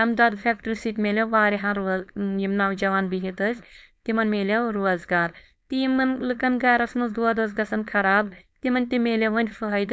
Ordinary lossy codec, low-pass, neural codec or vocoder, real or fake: none; none; codec, 16 kHz, 4.8 kbps, FACodec; fake